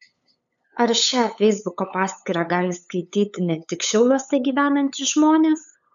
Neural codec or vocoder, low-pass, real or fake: codec, 16 kHz, 8 kbps, FunCodec, trained on LibriTTS, 25 frames a second; 7.2 kHz; fake